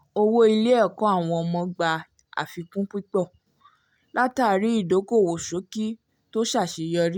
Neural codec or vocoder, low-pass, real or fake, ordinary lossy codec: none; 19.8 kHz; real; none